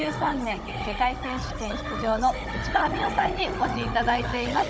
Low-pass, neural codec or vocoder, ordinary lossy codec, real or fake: none; codec, 16 kHz, 16 kbps, FunCodec, trained on Chinese and English, 50 frames a second; none; fake